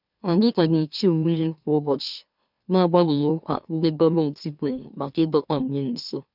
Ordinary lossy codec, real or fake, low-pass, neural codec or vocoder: none; fake; 5.4 kHz; autoencoder, 44.1 kHz, a latent of 192 numbers a frame, MeloTTS